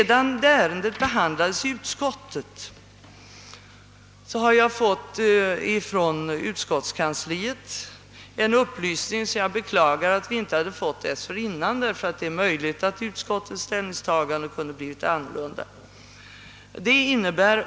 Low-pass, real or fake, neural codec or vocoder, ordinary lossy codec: none; real; none; none